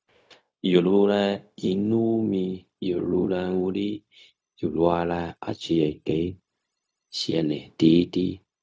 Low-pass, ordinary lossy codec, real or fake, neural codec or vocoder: none; none; fake; codec, 16 kHz, 0.4 kbps, LongCat-Audio-Codec